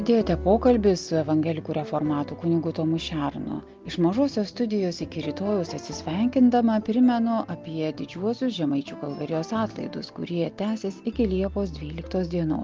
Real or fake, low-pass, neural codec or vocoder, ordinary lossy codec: real; 7.2 kHz; none; Opus, 32 kbps